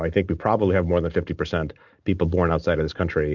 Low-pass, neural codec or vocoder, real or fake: 7.2 kHz; none; real